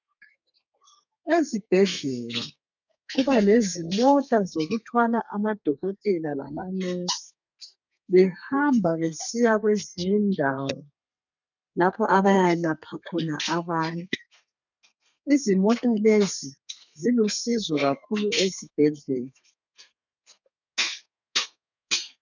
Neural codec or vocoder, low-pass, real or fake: codec, 32 kHz, 1.9 kbps, SNAC; 7.2 kHz; fake